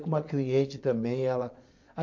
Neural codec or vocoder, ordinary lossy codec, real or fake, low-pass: vocoder, 22.05 kHz, 80 mel bands, Vocos; MP3, 48 kbps; fake; 7.2 kHz